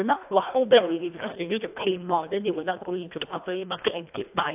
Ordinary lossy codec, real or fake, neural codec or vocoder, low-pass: AAC, 32 kbps; fake; codec, 24 kHz, 1.5 kbps, HILCodec; 3.6 kHz